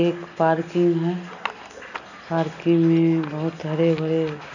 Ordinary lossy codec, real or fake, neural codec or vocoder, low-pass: none; real; none; 7.2 kHz